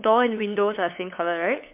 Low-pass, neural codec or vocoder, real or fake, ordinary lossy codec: 3.6 kHz; codec, 16 kHz, 8 kbps, FunCodec, trained on LibriTTS, 25 frames a second; fake; MP3, 32 kbps